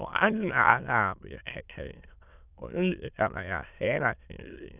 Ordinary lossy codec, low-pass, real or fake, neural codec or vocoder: none; 3.6 kHz; fake; autoencoder, 22.05 kHz, a latent of 192 numbers a frame, VITS, trained on many speakers